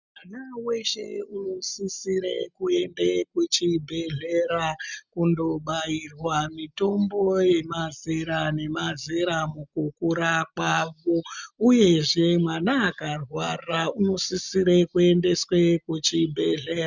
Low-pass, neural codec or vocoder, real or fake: 7.2 kHz; none; real